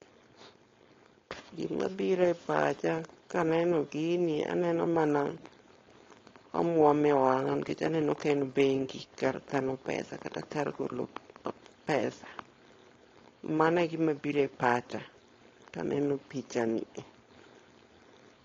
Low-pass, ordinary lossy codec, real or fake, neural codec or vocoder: 7.2 kHz; AAC, 32 kbps; fake; codec, 16 kHz, 4.8 kbps, FACodec